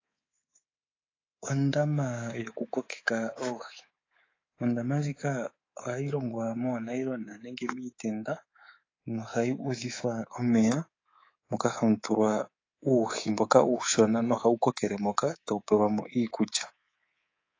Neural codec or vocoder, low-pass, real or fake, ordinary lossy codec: codec, 24 kHz, 3.1 kbps, DualCodec; 7.2 kHz; fake; AAC, 32 kbps